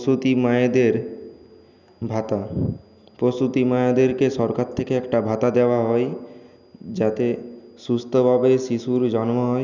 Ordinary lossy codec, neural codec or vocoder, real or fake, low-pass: none; none; real; 7.2 kHz